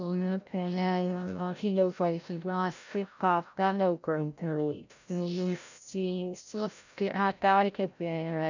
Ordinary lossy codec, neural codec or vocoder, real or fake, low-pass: none; codec, 16 kHz, 0.5 kbps, FreqCodec, larger model; fake; 7.2 kHz